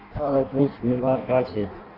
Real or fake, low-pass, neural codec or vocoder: fake; 5.4 kHz; codec, 16 kHz in and 24 kHz out, 0.6 kbps, FireRedTTS-2 codec